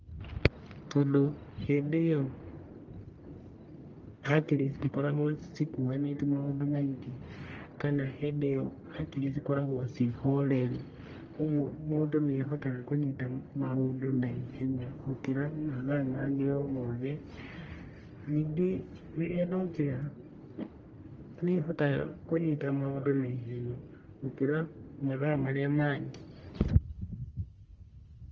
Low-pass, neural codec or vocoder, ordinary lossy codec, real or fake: 7.2 kHz; codec, 44.1 kHz, 1.7 kbps, Pupu-Codec; Opus, 24 kbps; fake